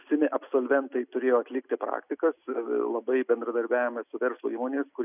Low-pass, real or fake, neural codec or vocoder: 3.6 kHz; real; none